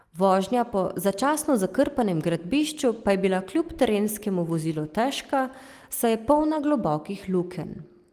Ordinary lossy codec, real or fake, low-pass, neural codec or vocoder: Opus, 24 kbps; real; 14.4 kHz; none